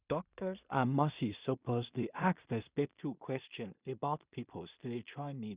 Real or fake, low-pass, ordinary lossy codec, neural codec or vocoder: fake; 3.6 kHz; Opus, 64 kbps; codec, 16 kHz in and 24 kHz out, 0.4 kbps, LongCat-Audio-Codec, two codebook decoder